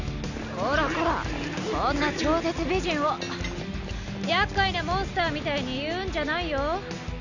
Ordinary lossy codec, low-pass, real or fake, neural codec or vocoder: none; 7.2 kHz; real; none